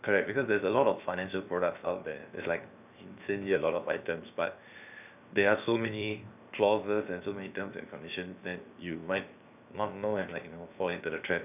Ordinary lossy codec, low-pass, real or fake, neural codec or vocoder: none; 3.6 kHz; fake; codec, 16 kHz, 0.7 kbps, FocalCodec